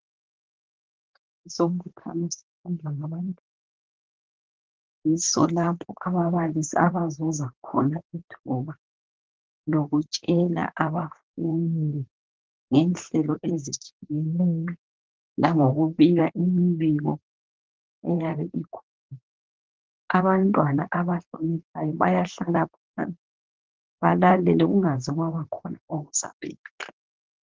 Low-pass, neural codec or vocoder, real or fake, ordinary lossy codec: 7.2 kHz; vocoder, 44.1 kHz, 128 mel bands, Pupu-Vocoder; fake; Opus, 16 kbps